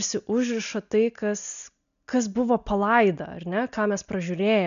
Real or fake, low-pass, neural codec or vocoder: real; 7.2 kHz; none